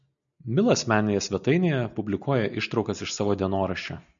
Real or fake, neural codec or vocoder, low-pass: real; none; 7.2 kHz